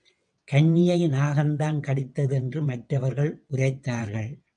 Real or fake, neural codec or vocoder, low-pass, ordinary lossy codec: fake; vocoder, 22.05 kHz, 80 mel bands, WaveNeXt; 9.9 kHz; MP3, 96 kbps